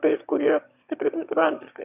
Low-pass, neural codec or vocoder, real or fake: 3.6 kHz; autoencoder, 22.05 kHz, a latent of 192 numbers a frame, VITS, trained on one speaker; fake